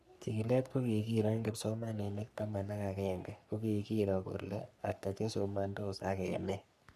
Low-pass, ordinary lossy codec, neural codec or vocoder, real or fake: 14.4 kHz; none; codec, 44.1 kHz, 3.4 kbps, Pupu-Codec; fake